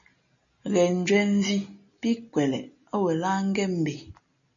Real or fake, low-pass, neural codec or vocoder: real; 7.2 kHz; none